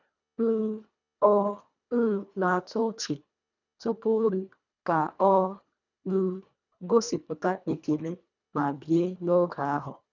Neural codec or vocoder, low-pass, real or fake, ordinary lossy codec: codec, 24 kHz, 1.5 kbps, HILCodec; 7.2 kHz; fake; none